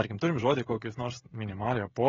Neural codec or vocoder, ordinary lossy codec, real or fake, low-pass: codec, 16 kHz, 16 kbps, FreqCodec, smaller model; AAC, 24 kbps; fake; 7.2 kHz